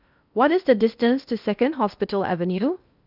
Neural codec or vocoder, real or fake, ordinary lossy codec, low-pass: codec, 16 kHz in and 24 kHz out, 0.8 kbps, FocalCodec, streaming, 65536 codes; fake; none; 5.4 kHz